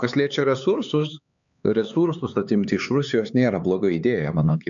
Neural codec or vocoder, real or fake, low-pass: codec, 16 kHz, 4 kbps, X-Codec, HuBERT features, trained on LibriSpeech; fake; 7.2 kHz